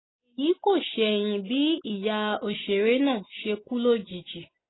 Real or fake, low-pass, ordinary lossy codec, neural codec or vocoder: real; 7.2 kHz; AAC, 16 kbps; none